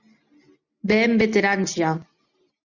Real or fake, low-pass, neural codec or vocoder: real; 7.2 kHz; none